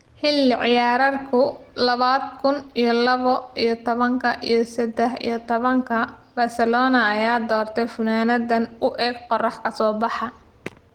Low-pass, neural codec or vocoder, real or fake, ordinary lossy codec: 19.8 kHz; none; real; Opus, 16 kbps